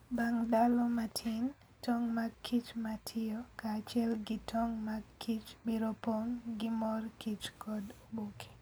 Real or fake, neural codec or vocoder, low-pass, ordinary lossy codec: real; none; none; none